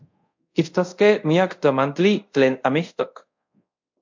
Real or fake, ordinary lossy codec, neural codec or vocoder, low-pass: fake; MP3, 48 kbps; codec, 24 kHz, 0.5 kbps, DualCodec; 7.2 kHz